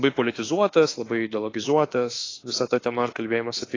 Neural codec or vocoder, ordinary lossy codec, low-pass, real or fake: autoencoder, 48 kHz, 32 numbers a frame, DAC-VAE, trained on Japanese speech; AAC, 32 kbps; 7.2 kHz; fake